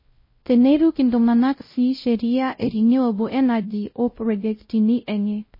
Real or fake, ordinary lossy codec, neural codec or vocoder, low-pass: fake; MP3, 24 kbps; codec, 16 kHz, 0.5 kbps, X-Codec, WavLM features, trained on Multilingual LibriSpeech; 5.4 kHz